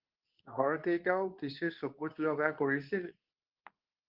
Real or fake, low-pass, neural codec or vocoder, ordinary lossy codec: fake; 5.4 kHz; codec, 24 kHz, 0.9 kbps, WavTokenizer, medium speech release version 2; Opus, 32 kbps